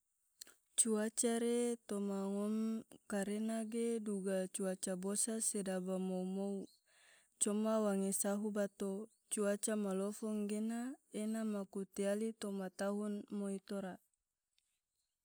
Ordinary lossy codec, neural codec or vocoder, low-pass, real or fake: none; none; none; real